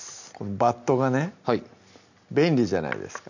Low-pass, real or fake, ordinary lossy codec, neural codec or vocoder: 7.2 kHz; real; none; none